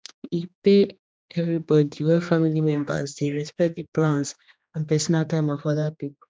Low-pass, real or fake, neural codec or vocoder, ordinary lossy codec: none; fake; codec, 16 kHz, 2 kbps, X-Codec, HuBERT features, trained on general audio; none